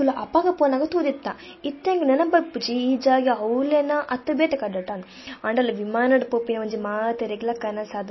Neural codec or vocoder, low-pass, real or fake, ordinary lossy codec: none; 7.2 kHz; real; MP3, 24 kbps